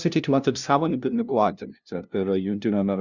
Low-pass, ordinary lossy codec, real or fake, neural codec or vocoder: 7.2 kHz; Opus, 64 kbps; fake; codec, 16 kHz, 0.5 kbps, FunCodec, trained on LibriTTS, 25 frames a second